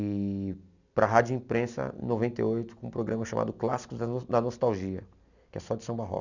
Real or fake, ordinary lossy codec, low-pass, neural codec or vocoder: real; none; 7.2 kHz; none